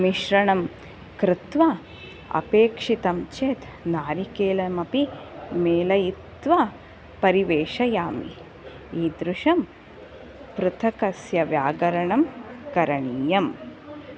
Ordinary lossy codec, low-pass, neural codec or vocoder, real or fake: none; none; none; real